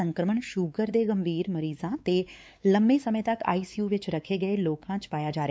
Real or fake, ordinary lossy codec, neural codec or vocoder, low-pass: fake; none; codec, 16 kHz, 4 kbps, X-Codec, WavLM features, trained on Multilingual LibriSpeech; none